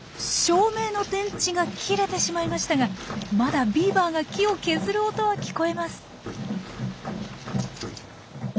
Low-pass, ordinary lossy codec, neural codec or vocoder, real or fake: none; none; none; real